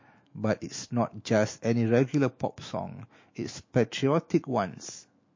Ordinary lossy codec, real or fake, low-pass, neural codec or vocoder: MP3, 32 kbps; fake; 7.2 kHz; autoencoder, 48 kHz, 128 numbers a frame, DAC-VAE, trained on Japanese speech